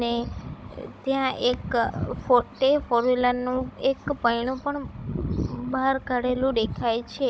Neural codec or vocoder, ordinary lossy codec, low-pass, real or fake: codec, 16 kHz, 16 kbps, FunCodec, trained on Chinese and English, 50 frames a second; none; none; fake